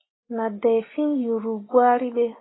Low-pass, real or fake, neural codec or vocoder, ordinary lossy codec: 7.2 kHz; real; none; AAC, 16 kbps